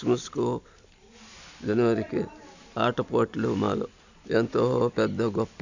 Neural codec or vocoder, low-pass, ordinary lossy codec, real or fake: none; 7.2 kHz; none; real